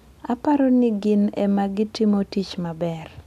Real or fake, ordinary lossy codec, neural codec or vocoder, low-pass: real; none; none; 14.4 kHz